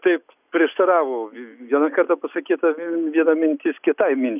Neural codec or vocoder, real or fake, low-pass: none; real; 3.6 kHz